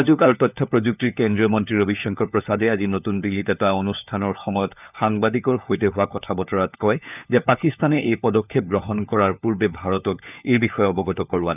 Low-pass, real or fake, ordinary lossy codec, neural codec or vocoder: 3.6 kHz; fake; none; codec, 16 kHz, 4 kbps, FunCodec, trained on LibriTTS, 50 frames a second